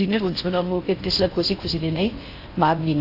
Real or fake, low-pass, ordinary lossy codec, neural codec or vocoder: fake; 5.4 kHz; AAC, 32 kbps; codec, 16 kHz in and 24 kHz out, 0.6 kbps, FocalCodec, streaming, 4096 codes